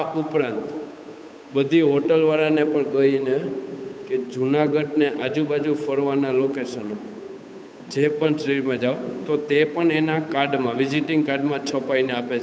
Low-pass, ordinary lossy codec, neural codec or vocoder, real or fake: none; none; codec, 16 kHz, 8 kbps, FunCodec, trained on Chinese and English, 25 frames a second; fake